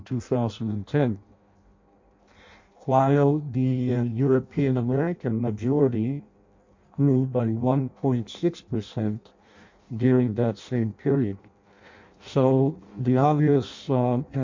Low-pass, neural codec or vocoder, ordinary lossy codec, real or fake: 7.2 kHz; codec, 16 kHz in and 24 kHz out, 0.6 kbps, FireRedTTS-2 codec; MP3, 48 kbps; fake